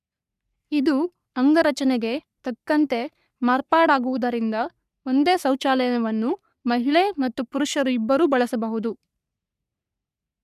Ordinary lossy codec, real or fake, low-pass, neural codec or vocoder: none; fake; 14.4 kHz; codec, 44.1 kHz, 3.4 kbps, Pupu-Codec